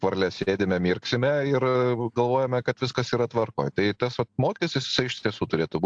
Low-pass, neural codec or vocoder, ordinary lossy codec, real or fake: 9.9 kHz; none; AAC, 64 kbps; real